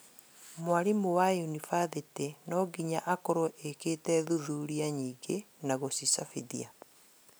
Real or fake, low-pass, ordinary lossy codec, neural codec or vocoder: real; none; none; none